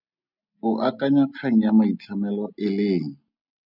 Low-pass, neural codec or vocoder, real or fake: 5.4 kHz; none; real